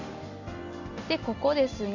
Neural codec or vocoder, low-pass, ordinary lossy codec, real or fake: none; 7.2 kHz; none; real